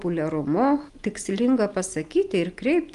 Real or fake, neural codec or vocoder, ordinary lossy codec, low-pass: real; none; Opus, 32 kbps; 10.8 kHz